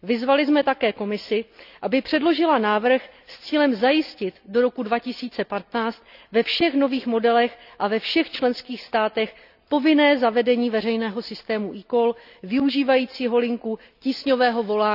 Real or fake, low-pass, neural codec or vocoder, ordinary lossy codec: real; 5.4 kHz; none; none